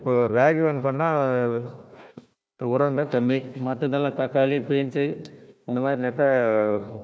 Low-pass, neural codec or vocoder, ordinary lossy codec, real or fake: none; codec, 16 kHz, 1 kbps, FunCodec, trained on Chinese and English, 50 frames a second; none; fake